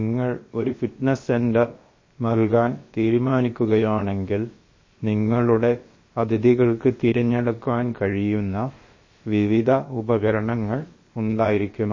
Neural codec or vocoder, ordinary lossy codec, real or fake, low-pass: codec, 16 kHz, about 1 kbps, DyCAST, with the encoder's durations; MP3, 32 kbps; fake; 7.2 kHz